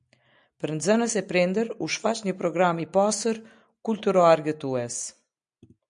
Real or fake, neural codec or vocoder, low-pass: real; none; 9.9 kHz